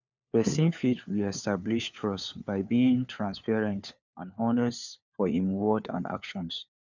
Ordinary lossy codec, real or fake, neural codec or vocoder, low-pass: none; fake; codec, 16 kHz, 4 kbps, FunCodec, trained on LibriTTS, 50 frames a second; 7.2 kHz